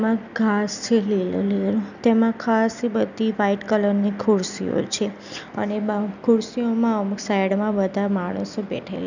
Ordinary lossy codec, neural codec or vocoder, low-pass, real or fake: none; none; 7.2 kHz; real